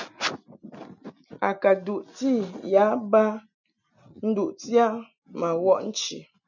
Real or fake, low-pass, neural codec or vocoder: real; 7.2 kHz; none